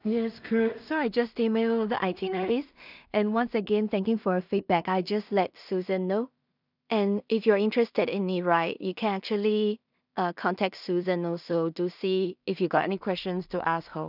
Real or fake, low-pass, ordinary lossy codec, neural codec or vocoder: fake; 5.4 kHz; none; codec, 16 kHz in and 24 kHz out, 0.4 kbps, LongCat-Audio-Codec, two codebook decoder